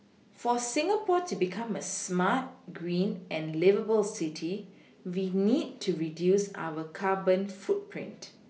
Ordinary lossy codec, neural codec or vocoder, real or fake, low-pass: none; none; real; none